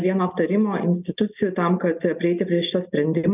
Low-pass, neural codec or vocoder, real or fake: 3.6 kHz; none; real